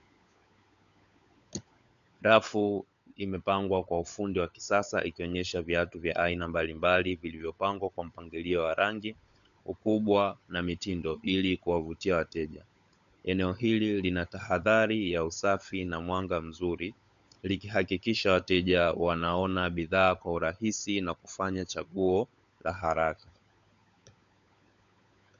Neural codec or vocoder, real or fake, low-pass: codec, 16 kHz, 16 kbps, FunCodec, trained on LibriTTS, 50 frames a second; fake; 7.2 kHz